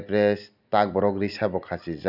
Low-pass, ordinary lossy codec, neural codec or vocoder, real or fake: 5.4 kHz; none; none; real